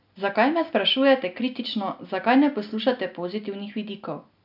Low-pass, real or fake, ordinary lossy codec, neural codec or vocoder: 5.4 kHz; real; none; none